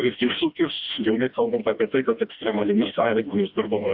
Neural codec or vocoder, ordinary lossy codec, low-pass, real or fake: codec, 16 kHz, 1 kbps, FreqCodec, smaller model; Opus, 64 kbps; 5.4 kHz; fake